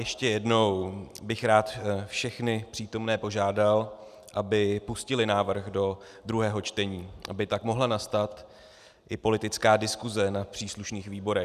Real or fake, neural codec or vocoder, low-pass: real; none; 14.4 kHz